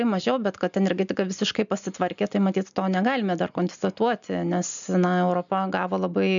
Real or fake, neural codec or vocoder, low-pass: real; none; 7.2 kHz